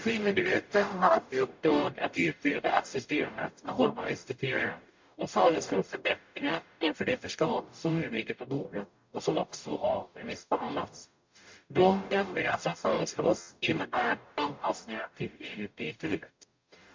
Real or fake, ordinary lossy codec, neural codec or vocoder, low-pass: fake; MP3, 48 kbps; codec, 44.1 kHz, 0.9 kbps, DAC; 7.2 kHz